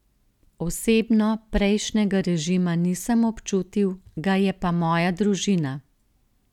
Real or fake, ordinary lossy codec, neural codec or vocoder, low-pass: real; none; none; 19.8 kHz